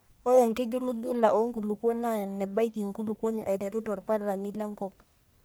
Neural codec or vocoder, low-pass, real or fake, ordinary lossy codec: codec, 44.1 kHz, 1.7 kbps, Pupu-Codec; none; fake; none